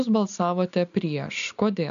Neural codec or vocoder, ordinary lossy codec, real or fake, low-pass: none; MP3, 96 kbps; real; 7.2 kHz